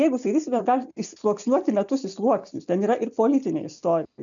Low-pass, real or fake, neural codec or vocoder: 7.2 kHz; real; none